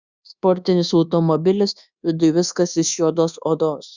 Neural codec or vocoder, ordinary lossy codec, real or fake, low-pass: codec, 24 kHz, 1.2 kbps, DualCodec; Opus, 64 kbps; fake; 7.2 kHz